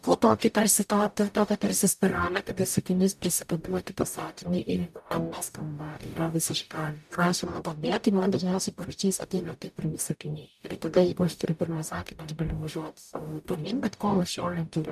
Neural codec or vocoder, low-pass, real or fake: codec, 44.1 kHz, 0.9 kbps, DAC; 14.4 kHz; fake